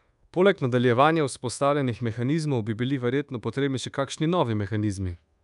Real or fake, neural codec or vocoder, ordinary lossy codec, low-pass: fake; codec, 24 kHz, 1.2 kbps, DualCodec; none; 10.8 kHz